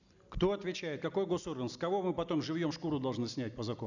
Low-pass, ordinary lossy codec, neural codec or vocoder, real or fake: 7.2 kHz; none; none; real